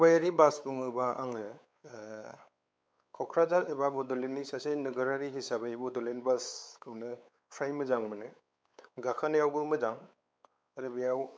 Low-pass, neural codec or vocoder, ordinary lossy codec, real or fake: none; codec, 16 kHz, 4 kbps, X-Codec, WavLM features, trained on Multilingual LibriSpeech; none; fake